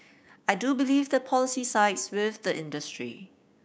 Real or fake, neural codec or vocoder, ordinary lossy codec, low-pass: fake; codec, 16 kHz, 6 kbps, DAC; none; none